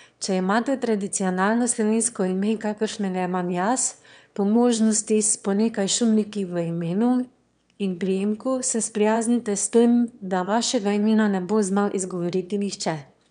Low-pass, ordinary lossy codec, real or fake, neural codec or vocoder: 9.9 kHz; none; fake; autoencoder, 22.05 kHz, a latent of 192 numbers a frame, VITS, trained on one speaker